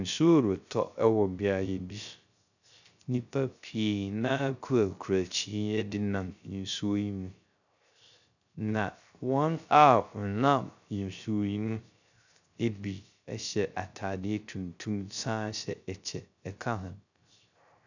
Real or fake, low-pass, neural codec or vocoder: fake; 7.2 kHz; codec, 16 kHz, 0.3 kbps, FocalCodec